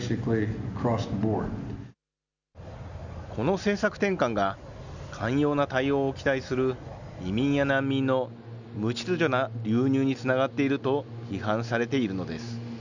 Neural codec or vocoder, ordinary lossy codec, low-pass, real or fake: none; none; 7.2 kHz; real